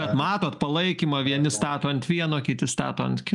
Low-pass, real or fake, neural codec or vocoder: 10.8 kHz; real; none